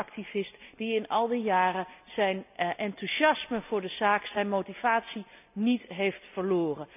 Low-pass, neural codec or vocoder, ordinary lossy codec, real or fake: 3.6 kHz; none; none; real